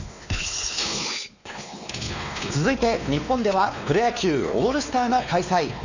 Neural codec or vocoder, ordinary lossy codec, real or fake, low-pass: codec, 16 kHz, 2 kbps, X-Codec, WavLM features, trained on Multilingual LibriSpeech; none; fake; 7.2 kHz